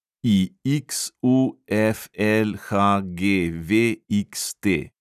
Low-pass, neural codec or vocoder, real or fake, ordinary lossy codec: 14.4 kHz; none; real; none